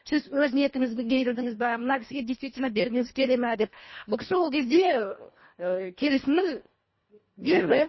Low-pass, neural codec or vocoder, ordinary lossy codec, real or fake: 7.2 kHz; codec, 24 kHz, 1.5 kbps, HILCodec; MP3, 24 kbps; fake